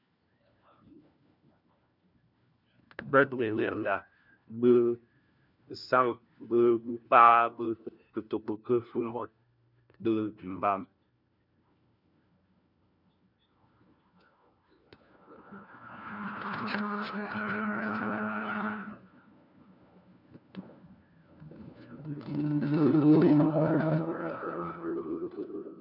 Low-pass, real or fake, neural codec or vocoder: 5.4 kHz; fake; codec, 16 kHz, 1 kbps, FunCodec, trained on LibriTTS, 50 frames a second